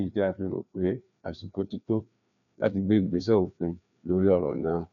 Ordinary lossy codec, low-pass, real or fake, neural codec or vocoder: none; 7.2 kHz; fake; codec, 16 kHz, 2 kbps, FreqCodec, larger model